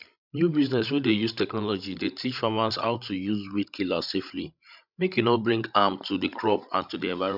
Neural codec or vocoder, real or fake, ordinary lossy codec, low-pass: codec, 16 kHz, 16 kbps, FreqCodec, larger model; fake; none; 5.4 kHz